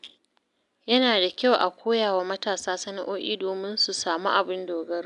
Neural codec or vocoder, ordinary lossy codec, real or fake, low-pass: none; none; real; 10.8 kHz